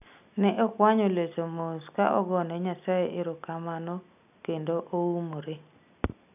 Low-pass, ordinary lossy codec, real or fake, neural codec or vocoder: 3.6 kHz; none; real; none